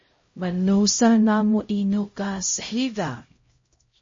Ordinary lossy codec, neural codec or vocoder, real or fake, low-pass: MP3, 32 kbps; codec, 16 kHz, 0.5 kbps, X-Codec, HuBERT features, trained on LibriSpeech; fake; 7.2 kHz